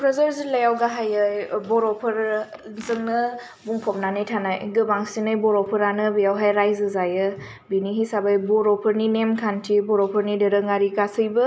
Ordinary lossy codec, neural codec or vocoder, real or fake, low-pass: none; none; real; none